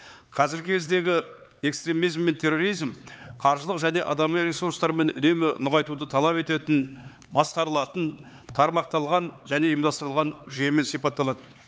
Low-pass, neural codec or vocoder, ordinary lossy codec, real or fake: none; codec, 16 kHz, 4 kbps, X-Codec, HuBERT features, trained on LibriSpeech; none; fake